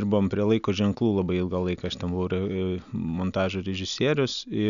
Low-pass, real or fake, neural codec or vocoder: 7.2 kHz; real; none